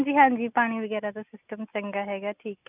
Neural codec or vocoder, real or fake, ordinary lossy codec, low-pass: none; real; none; 3.6 kHz